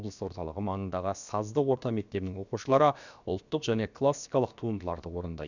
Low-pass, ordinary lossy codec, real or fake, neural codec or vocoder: 7.2 kHz; none; fake; codec, 16 kHz, about 1 kbps, DyCAST, with the encoder's durations